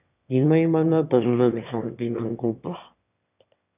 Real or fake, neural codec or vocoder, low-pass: fake; autoencoder, 22.05 kHz, a latent of 192 numbers a frame, VITS, trained on one speaker; 3.6 kHz